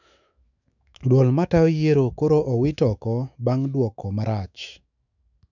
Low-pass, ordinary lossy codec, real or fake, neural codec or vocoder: 7.2 kHz; none; fake; autoencoder, 48 kHz, 128 numbers a frame, DAC-VAE, trained on Japanese speech